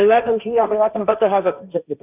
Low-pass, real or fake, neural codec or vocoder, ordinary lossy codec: 3.6 kHz; fake; codec, 16 kHz, 1.1 kbps, Voila-Tokenizer; none